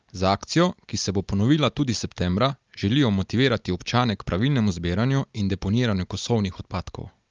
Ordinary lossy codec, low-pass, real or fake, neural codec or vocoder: Opus, 24 kbps; 7.2 kHz; real; none